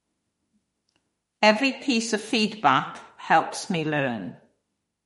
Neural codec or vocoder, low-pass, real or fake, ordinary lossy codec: autoencoder, 48 kHz, 32 numbers a frame, DAC-VAE, trained on Japanese speech; 19.8 kHz; fake; MP3, 48 kbps